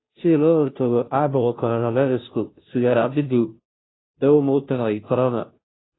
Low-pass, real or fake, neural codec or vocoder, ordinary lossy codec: 7.2 kHz; fake; codec, 16 kHz, 0.5 kbps, FunCodec, trained on Chinese and English, 25 frames a second; AAC, 16 kbps